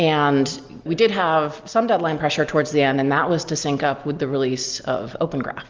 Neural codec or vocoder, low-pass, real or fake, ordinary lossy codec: none; 7.2 kHz; real; Opus, 32 kbps